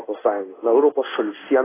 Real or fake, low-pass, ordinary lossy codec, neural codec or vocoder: fake; 3.6 kHz; AAC, 16 kbps; codec, 16 kHz in and 24 kHz out, 1 kbps, XY-Tokenizer